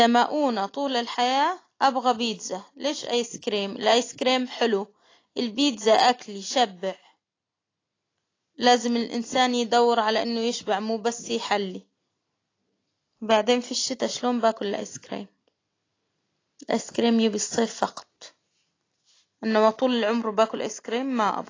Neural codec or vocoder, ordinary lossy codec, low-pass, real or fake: none; AAC, 32 kbps; 7.2 kHz; real